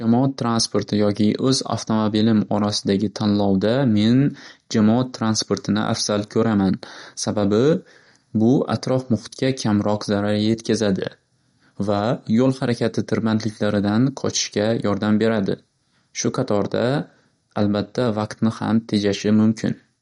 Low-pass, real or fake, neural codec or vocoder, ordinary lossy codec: 10.8 kHz; real; none; MP3, 48 kbps